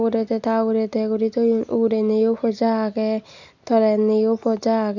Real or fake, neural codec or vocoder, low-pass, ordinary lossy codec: real; none; 7.2 kHz; none